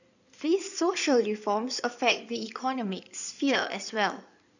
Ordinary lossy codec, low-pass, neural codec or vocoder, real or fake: none; 7.2 kHz; codec, 16 kHz in and 24 kHz out, 2.2 kbps, FireRedTTS-2 codec; fake